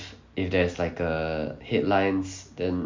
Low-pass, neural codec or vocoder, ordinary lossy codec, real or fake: 7.2 kHz; none; MP3, 48 kbps; real